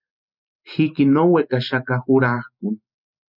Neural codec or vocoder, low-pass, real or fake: none; 5.4 kHz; real